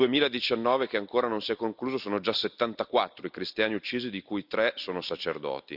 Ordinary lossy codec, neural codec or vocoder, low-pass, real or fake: none; none; 5.4 kHz; real